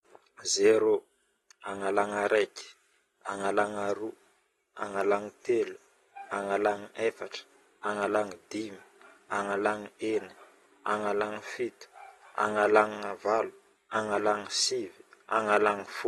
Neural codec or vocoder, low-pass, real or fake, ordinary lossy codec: none; 19.8 kHz; real; AAC, 32 kbps